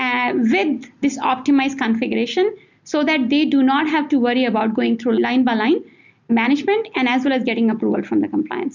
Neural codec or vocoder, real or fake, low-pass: none; real; 7.2 kHz